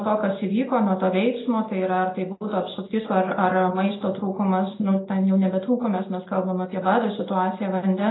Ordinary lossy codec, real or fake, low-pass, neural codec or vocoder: AAC, 16 kbps; real; 7.2 kHz; none